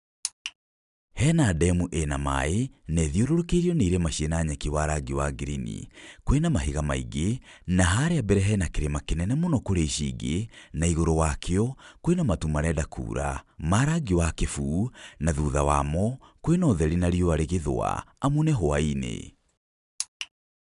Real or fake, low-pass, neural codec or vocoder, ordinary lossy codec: real; 10.8 kHz; none; none